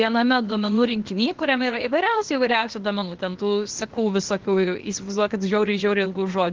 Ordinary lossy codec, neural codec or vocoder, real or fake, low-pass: Opus, 16 kbps; codec, 16 kHz, 0.8 kbps, ZipCodec; fake; 7.2 kHz